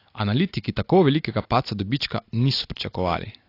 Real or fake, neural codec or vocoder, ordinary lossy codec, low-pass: real; none; AAC, 32 kbps; 5.4 kHz